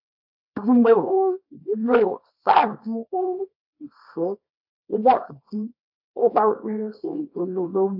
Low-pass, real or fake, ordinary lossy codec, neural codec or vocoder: 5.4 kHz; fake; AAC, 48 kbps; codec, 24 kHz, 0.9 kbps, WavTokenizer, small release